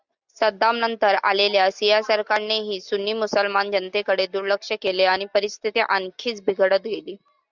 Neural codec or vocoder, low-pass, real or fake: none; 7.2 kHz; real